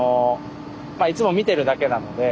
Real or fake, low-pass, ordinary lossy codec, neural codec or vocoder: real; none; none; none